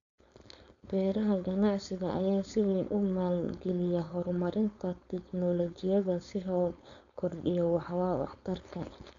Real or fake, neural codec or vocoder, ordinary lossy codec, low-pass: fake; codec, 16 kHz, 4.8 kbps, FACodec; MP3, 96 kbps; 7.2 kHz